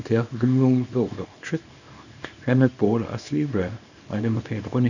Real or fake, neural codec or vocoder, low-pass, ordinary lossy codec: fake; codec, 24 kHz, 0.9 kbps, WavTokenizer, small release; 7.2 kHz; none